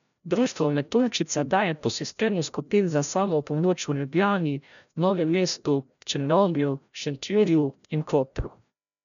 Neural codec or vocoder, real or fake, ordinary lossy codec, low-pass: codec, 16 kHz, 0.5 kbps, FreqCodec, larger model; fake; none; 7.2 kHz